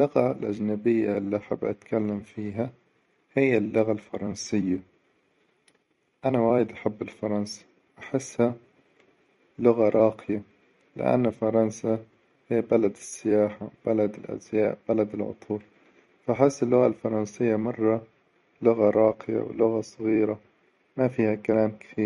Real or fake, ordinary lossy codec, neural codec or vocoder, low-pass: fake; MP3, 48 kbps; vocoder, 44.1 kHz, 128 mel bands every 256 samples, BigVGAN v2; 19.8 kHz